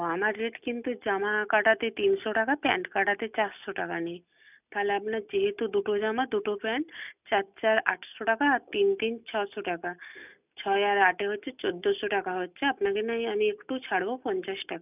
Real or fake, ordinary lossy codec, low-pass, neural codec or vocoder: real; none; 3.6 kHz; none